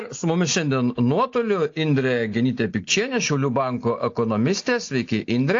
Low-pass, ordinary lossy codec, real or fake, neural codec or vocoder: 7.2 kHz; AAC, 48 kbps; real; none